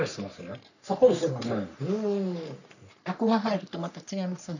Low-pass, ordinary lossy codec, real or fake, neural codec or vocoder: 7.2 kHz; none; fake; codec, 44.1 kHz, 3.4 kbps, Pupu-Codec